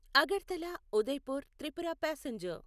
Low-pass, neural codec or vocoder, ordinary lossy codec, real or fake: 14.4 kHz; none; none; real